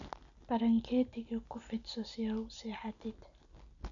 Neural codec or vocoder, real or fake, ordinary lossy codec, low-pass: none; real; none; 7.2 kHz